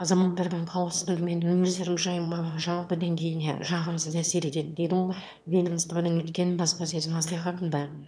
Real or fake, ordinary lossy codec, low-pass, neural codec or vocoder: fake; none; none; autoencoder, 22.05 kHz, a latent of 192 numbers a frame, VITS, trained on one speaker